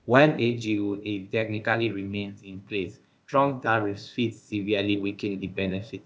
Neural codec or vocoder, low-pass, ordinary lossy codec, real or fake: codec, 16 kHz, 0.8 kbps, ZipCodec; none; none; fake